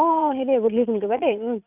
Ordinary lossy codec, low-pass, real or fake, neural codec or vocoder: none; 3.6 kHz; fake; vocoder, 22.05 kHz, 80 mel bands, Vocos